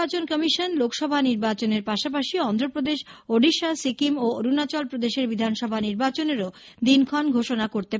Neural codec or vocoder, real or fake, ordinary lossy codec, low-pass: none; real; none; none